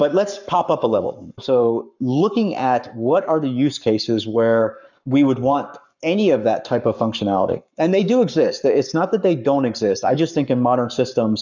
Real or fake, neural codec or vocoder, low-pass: fake; codec, 44.1 kHz, 7.8 kbps, Pupu-Codec; 7.2 kHz